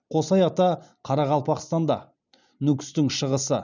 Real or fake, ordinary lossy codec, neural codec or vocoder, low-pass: real; none; none; 7.2 kHz